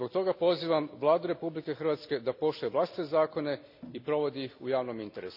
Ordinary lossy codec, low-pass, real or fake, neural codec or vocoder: none; 5.4 kHz; real; none